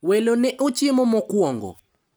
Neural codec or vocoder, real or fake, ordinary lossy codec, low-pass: none; real; none; none